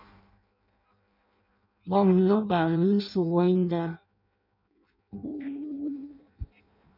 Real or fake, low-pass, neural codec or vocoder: fake; 5.4 kHz; codec, 16 kHz in and 24 kHz out, 0.6 kbps, FireRedTTS-2 codec